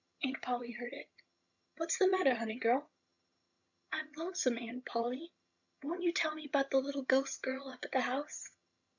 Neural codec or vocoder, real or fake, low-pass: vocoder, 22.05 kHz, 80 mel bands, HiFi-GAN; fake; 7.2 kHz